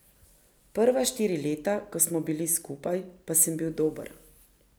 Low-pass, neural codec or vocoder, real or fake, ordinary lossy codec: none; none; real; none